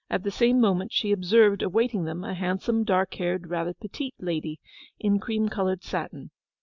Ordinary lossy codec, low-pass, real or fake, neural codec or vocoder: MP3, 64 kbps; 7.2 kHz; real; none